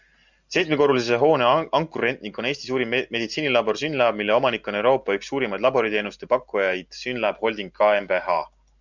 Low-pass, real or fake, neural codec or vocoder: 7.2 kHz; real; none